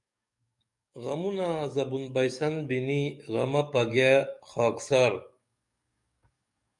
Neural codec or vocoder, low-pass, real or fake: codec, 44.1 kHz, 7.8 kbps, DAC; 10.8 kHz; fake